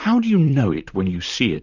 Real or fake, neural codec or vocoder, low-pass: fake; vocoder, 44.1 kHz, 128 mel bands, Pupu-Vocoder; 7.2 kHz